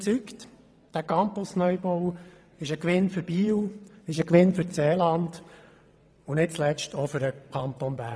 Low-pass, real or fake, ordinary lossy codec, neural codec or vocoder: none; fake; none; vocoder, 22.05 kHz, 80 mel bands, WaveNeXt